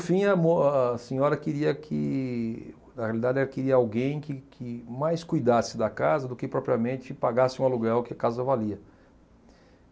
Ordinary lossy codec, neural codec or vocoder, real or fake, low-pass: none; none; real; none